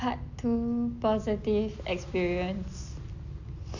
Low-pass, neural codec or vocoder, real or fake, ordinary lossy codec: 7.2 kHz; none; real; none